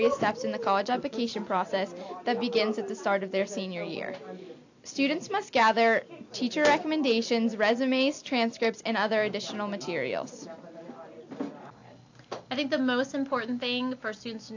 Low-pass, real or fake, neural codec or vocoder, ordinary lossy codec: 7.2 kHz; real; none; AAC, 48 kbps